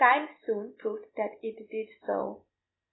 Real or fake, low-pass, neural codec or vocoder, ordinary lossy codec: real; 7.2 kHz; none; AAC, 16 kbps